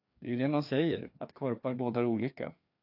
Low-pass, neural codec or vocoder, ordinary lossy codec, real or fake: 5.4 kHz; codec, 16 kHz, 2 kbps, FreqCodec, larger model; AAC, 48 kbps; fake